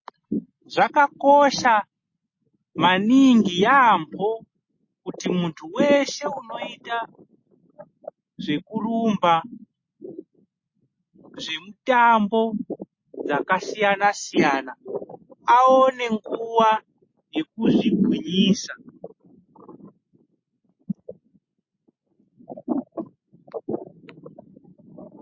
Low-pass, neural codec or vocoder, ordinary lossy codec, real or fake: 7.2 kHz; none; MP3, 32 kbps; real